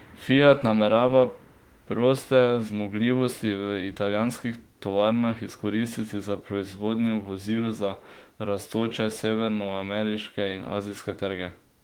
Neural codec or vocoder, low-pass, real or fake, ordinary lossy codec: autoencoder, 48 kHz, 32 numbers a frame, DAC-VAE, trained on Japanese speech; 19.8 kHz; fake; Opus, 24 kbps